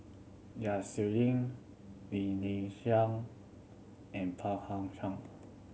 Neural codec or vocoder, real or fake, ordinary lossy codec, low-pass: none; real; none; none